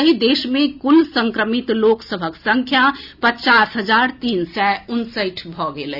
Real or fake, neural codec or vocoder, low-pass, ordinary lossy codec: real; none; 5.4 kHz; none